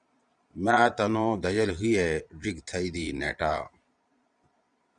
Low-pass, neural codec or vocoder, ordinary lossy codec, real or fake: 9.9 kHz; vocoder, 22.05 kHz, 80 mel bands, Vocos; Opus, 64 kbps; fake